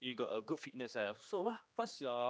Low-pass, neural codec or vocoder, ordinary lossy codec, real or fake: none; codec, 16 kHz, 2 kbps, X-Codec, HuBERT features, trained on general audio; none; fake